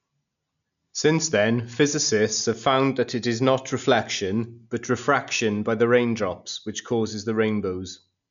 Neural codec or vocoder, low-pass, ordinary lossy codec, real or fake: none; 7.2 kHz; none; real